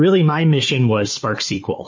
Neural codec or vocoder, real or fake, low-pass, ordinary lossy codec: codec, 16 kHz, 4 kbps, FunCodec, trained on Chinese and English, 50 frames a second; fake; 7.2 kHz; MP3, 32 kbps